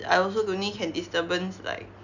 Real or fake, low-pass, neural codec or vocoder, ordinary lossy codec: real; 7.2 kHz; none; none